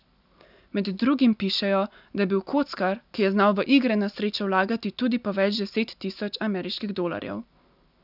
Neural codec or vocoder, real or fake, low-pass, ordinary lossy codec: none; real; 5.4 kHz; none